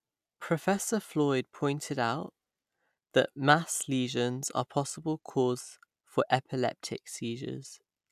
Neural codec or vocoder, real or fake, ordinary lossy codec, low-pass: none; real; none; 14.4 kHz